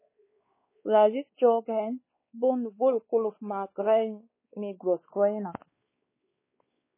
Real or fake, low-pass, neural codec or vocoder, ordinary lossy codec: fake; 3.6 kHz; codec, 16 kHz, 4 kbps, X-Codec, WavLM features, trained on Multilingual LibriSpeech; MP3, 16 kbps